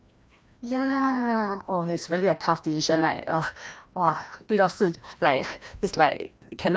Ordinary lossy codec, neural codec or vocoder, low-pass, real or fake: none; codec, 16 kHz, 1 kbps, FreqCodec, larger model; none; fake